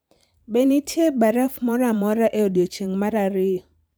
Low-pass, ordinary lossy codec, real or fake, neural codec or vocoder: none; none; fake; vocoder, 44.1 kHz, 128 mel bands every 512 samples, BigVGAN v2